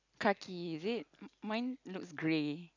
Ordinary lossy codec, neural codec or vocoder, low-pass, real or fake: none; none; 7.2 kHz; real